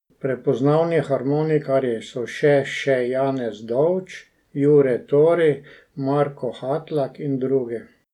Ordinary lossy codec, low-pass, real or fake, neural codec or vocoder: none; 19.8 kHz; real; none